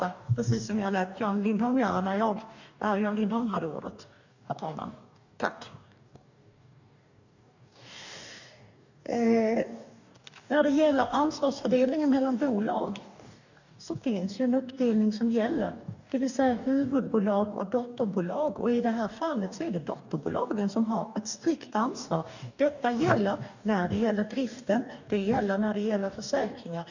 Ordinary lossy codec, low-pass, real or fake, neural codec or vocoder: none; 7.2 kHz; fake; codec, 44.1 kHz, 2.6 kbps, DAC